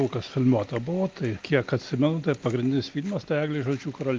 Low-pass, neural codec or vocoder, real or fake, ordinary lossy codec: 7.2 kHz; none; real; Opus, 24 kbps